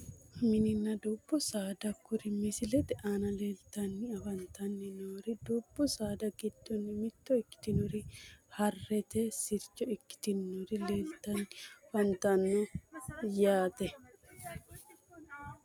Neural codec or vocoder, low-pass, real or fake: none; 19.8 kHz; real